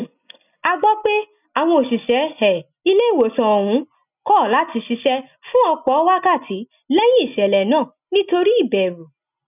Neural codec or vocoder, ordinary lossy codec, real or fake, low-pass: none; none; real; 3.6 kHz